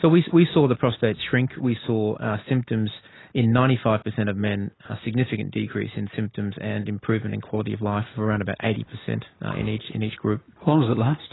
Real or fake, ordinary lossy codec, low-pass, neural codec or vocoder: real; AAC, 16 kbps; 7.2 kHz; none